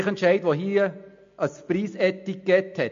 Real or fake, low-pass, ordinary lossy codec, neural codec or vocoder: real; 7.2 kHz; MP3, 48 kbps; none